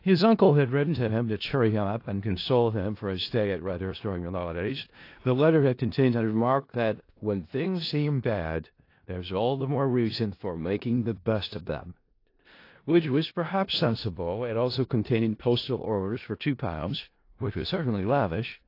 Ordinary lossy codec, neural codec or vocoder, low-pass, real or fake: AAC, 32 kbps; codec, 16 kHz in and 24 kHz out, 0.4 kbps, LongCat-Audio-Codec, four codebook decoder; 5.4 kHz; fake